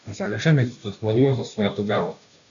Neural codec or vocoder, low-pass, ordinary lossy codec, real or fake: codec, 16 kHz, 0.5 kbps, FunCodec, trained on Chinese and English, 25 frames a second; 7.2 kHz; MP3, 96 kbps; fake